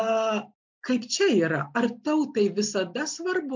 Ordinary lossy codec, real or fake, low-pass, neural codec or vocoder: MP3, 64 kbps; real; 7.2 kHz; none